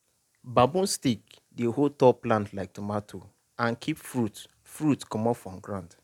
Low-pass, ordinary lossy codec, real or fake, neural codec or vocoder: 19.8 kHz; none; real; none